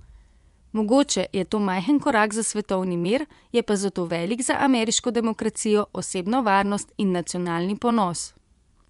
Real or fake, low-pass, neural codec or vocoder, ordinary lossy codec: fake; 10.8 kHz; vocoder, 24 kHz, 100 mel bands, Vocos; none